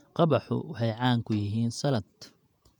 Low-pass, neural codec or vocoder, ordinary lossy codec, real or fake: 19.8 kHz; vocoder, 48 kHz, 128 mel bands, Vocos; none; fake